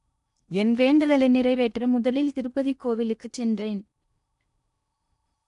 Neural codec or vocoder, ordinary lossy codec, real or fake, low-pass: codec, 16 kHz in and 24 kHz out, 0.8 kbps, FocalCodec, streaming, 65536 codes; none; fake; 10.8 kHz